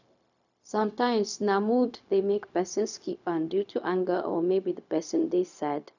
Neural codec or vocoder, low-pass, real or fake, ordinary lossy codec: codec, 16 kHz, 0.4 kbps, LongCat-Audio-Codec; 7.2 kHz; fake; none